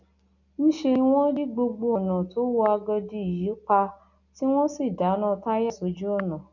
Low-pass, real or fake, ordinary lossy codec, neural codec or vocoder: 7.2 kHz; real; none; none